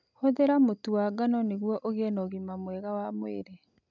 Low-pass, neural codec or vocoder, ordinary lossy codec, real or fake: 7.2 kHz; none; none; real